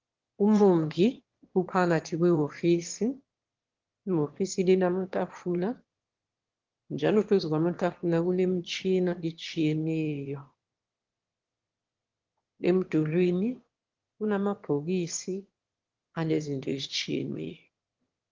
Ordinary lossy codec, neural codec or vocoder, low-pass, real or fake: Opus, 16 kbps; autoencoder, 22.05 kHz, a latent of 192 numbers a frame, VITS, trained on one speaker; 7.2 kHz; fake